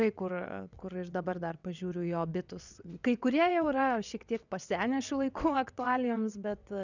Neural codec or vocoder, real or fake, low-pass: vocoder, 22.05 kHz, 80 mel bands, WaveNeXt; fake; 7.2 kHz